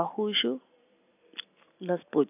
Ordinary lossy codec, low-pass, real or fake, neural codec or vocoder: none; 3.6 kHz; real; none